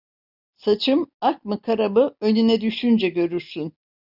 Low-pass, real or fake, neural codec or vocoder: 5.4 kHz; real; none